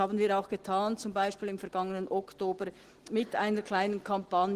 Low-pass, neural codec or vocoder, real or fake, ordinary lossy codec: 14.4 kHz; none; real; Opus, 16 kbps